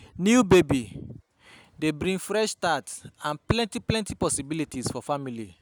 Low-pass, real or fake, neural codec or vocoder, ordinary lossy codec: none; real; none; none